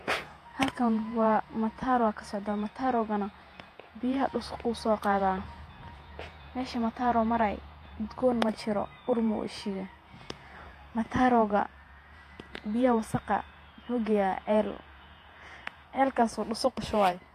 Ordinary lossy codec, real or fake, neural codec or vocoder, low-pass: AAC, 64 kbps; fake; vocoder, 48 kHz, 128 mel bands, Vocos; 14.4 kHz